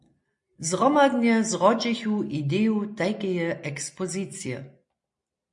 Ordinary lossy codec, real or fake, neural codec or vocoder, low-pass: MP3, 48 kbps; real; none; 10.8 kHz